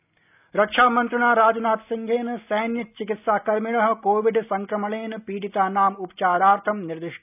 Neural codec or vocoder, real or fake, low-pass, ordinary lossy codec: none; real; 3.6 kHz; none